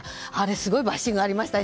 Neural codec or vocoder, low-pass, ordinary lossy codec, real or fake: none; none; none; real